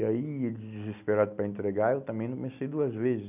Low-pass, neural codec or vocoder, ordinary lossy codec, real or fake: 3.6 kHz; none; none; real